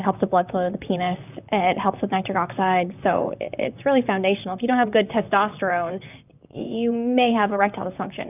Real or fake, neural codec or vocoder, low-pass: fake; vocoder, 44.1 kHz, 128 mel bands, Pupu-Vocoder; 3.6 kHz